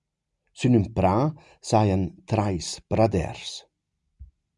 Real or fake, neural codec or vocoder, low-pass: real; none; 10.8 kHz